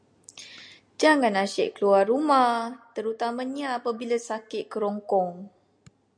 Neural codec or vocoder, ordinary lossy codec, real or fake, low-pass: none; MP3, 96 kbps; real; 9.9 kHz